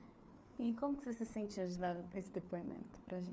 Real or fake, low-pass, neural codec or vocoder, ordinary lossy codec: fake; none; codec, 16 kHz, 4 kbps, FreqCodec, larger model; none